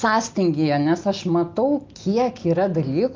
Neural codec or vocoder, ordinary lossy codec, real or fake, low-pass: none; Opus, 24 kbps; real; 7.2 kHz